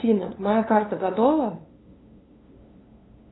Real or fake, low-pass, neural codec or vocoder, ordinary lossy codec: fake; 7.2 kHz; codec, 16 kHz, 2 kbps, FunCodec, trained on LibriTTS, 25 frames a second; AAC, 16 kbps